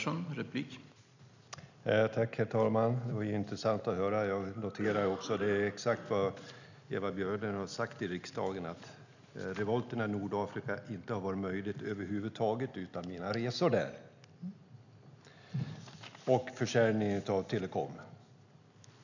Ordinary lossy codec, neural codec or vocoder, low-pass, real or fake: none; none; 7.2 kHz; real